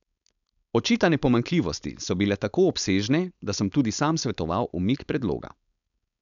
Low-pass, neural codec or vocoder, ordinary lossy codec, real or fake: 7.2 kHz; codec, 16 kHz, 4.8 kbps, FACodec; none; fake